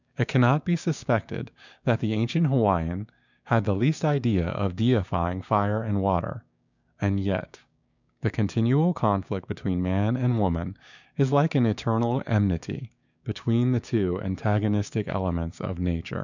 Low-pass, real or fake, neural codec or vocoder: 7.2 kHz; fake; codec, 16 kHz, 6 kbps, DAC